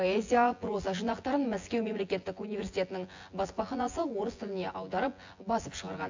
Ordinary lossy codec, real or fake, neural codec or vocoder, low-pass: AAC, 48 kbps; fake; vocoder, 24 kHz, 100 mel bands, Vocos; 7.2 kHz